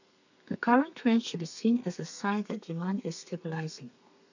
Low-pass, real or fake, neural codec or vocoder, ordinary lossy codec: 7.2 kHz; fake; codec, 32 kHz, 1.9 kbps, SNAC; none